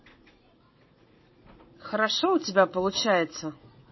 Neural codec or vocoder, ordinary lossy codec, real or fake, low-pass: vocoder, 44.1 kHz, 80 mel bands, Vocos; MP3, 24 kbps; fake; 7.2 kHz